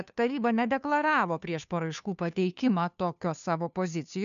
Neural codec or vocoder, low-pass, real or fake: codec, 16 kHz, 2 kbps, FunCodec, trained on Chinese and English, 25 frames a second; 7.2 kHz; fake